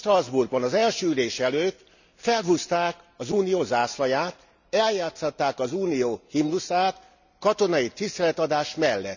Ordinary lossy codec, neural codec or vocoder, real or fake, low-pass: none; none; real; 7.2 kHz